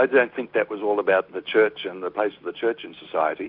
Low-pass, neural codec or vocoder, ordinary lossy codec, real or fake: 5.4 kHz; none; AAC, 48 kbps; real